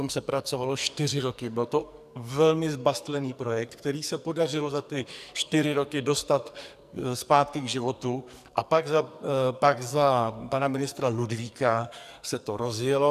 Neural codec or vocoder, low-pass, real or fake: codec, 44.1 kHz, 2.6 kbps, SNAC; 14.4 kHz; fake